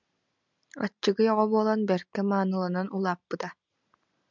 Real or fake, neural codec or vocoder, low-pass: real; none; 7.2 kHz